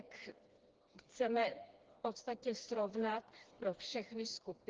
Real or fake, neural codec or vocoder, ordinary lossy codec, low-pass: fake; codec, 16 kHz, 2 kbps, FreqCodec, smaller model; Opus, 16 kbps; 7.2 kHz